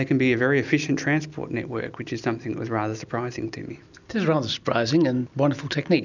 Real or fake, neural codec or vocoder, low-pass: real; none; 7.2 kHz